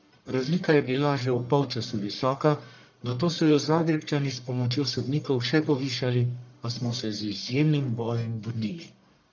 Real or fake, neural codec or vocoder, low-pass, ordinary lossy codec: fake; codec, 44.1 kHz, 1.7 kbps, Pupu-Codec; 7.2 kHz; none